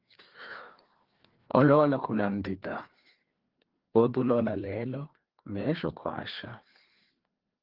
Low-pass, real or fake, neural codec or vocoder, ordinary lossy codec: 5.4 kHz; fake; codec, 16 kHz, 2 kbps, FreqCodec, larger model; Opus, 16 kbps